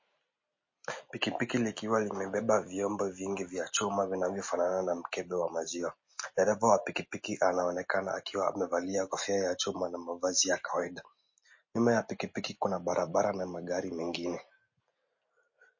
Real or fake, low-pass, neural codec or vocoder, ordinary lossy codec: real; 7.2 kHz; none; MP3, 32 kbps